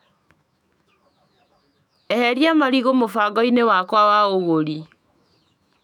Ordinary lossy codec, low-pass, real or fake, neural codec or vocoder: none; 19.8 kHz; fake; autoencoder, 48 kHz, 128 numbers a frame, DAC-VAE, trained on Japanese speech